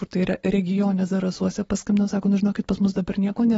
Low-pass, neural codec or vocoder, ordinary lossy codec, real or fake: 19.8 kHz; none; AAC, 24 kbps; real